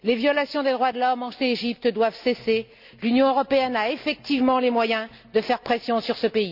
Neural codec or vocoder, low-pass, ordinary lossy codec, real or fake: none; 5.4 kHz; none; real